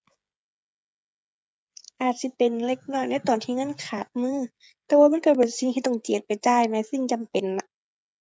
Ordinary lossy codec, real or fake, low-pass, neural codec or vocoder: none; fake; none; codec, 16 kHz, 16 kbps, FreqCodec, smaller model